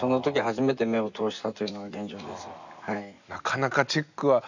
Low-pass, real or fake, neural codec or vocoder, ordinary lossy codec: 7.2 kHz; real; none; none